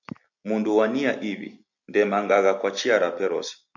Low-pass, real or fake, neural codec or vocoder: 7.2 kHz; real; none